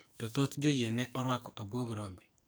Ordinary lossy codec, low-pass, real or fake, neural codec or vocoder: none; none; fake; codec, 44.1 kHz, 2.6 kbps, SNAC